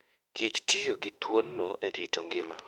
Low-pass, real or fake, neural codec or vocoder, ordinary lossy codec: 19.8 kHz; fake; autoencoder, 48 kHz, 32 numbers a frame, DAC-VAE, trained on Japanese speech; MP3, 96 kbps